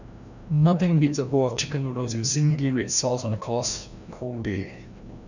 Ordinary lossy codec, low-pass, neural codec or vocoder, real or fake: none; 7.2 kHz; codec, 16 kHz, 1 kbps, FreqCodec, larger model; fake